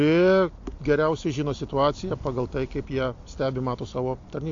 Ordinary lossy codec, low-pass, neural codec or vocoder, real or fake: AAC, 48 kbps; 7.2 kHz; none; real